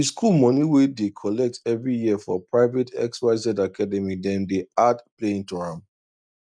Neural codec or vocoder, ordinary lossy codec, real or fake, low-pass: none; none; real; 9.9 kHz